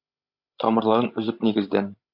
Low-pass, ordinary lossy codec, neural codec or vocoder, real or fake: 5.4 kHz; AAC, 48 kbps; codec, 16 kHz, 16 kbps, FreqCodec, larger model; fake